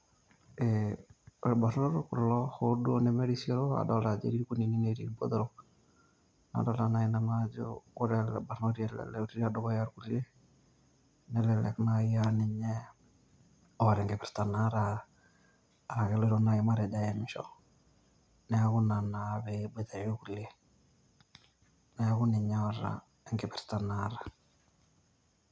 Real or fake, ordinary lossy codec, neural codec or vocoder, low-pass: real; none; none; none